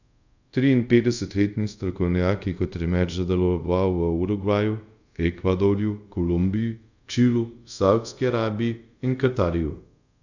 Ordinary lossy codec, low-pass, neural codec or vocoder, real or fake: none; 7.2 kHz; codec, 24 kHz, 0.5 kbps, DualCodec; fake